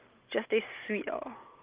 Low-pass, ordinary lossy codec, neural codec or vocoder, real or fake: 3.6 kHz; Opus, 32 kbps; none; real